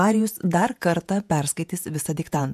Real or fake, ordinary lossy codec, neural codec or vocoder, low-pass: fake; MP3, 96 kbps; vocoder, 48 kHz, 128 mel bands, Vocos; 14.4 kHz